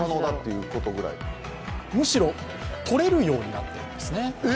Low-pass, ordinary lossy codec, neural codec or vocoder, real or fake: none; none; none; real